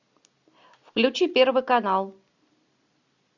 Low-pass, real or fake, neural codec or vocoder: 7.2 kHz; real; none